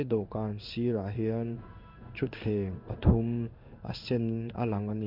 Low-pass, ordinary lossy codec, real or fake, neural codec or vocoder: 5.4 kHz; MP3, 48 kbps; fake; codec, 16 kHz in and 24 kHz out, 1 kbps, XY-Tokenizer